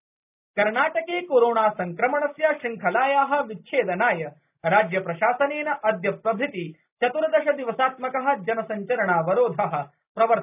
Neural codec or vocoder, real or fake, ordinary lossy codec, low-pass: none; real; none; 3.6 kHz